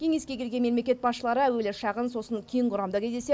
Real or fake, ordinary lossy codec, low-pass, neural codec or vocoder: real; none; none; none